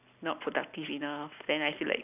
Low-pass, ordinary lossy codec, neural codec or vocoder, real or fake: 3.6 kHz; none; none; real